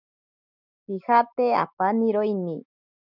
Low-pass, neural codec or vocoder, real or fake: 5.4 kHz; none; real